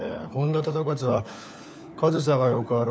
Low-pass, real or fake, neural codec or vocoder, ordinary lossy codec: none; fake; codec, 16 kHz, 4 kbps, FunCodec, trained on LibriTTS, 50 frames a second; none